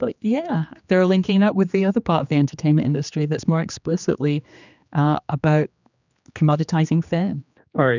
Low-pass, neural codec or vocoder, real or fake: 7.2 kHz; codec, 16 kHz, 2 kbps, X-Codec, HuBERT features, trained on general audio; fake